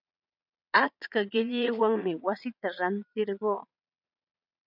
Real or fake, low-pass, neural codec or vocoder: fake; 5.4 kHz; vocoder, 22.05 kHz, 80 mel bands, Vocos